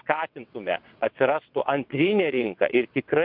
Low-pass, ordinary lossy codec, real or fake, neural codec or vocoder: 5.4 kHz; MP3, 48 kbps; fake; vocoder, 22.05 kHz, 80 mel bands, WaveNeXt